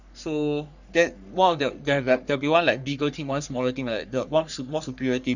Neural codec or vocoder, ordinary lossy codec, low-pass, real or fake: codec, 44.1 kHz, 3.4 kbps, Pupu-Codec; none; 7.2 kHz; fake